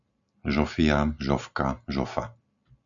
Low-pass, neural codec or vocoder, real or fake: 7.2 kHz; none; real